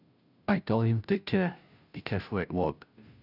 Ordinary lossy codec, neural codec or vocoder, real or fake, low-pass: none; codec, 16 kHz, 0.5 kbps, FunCodec, trained on Chinese and English, 25 frames a second; fake; 5.4 kHz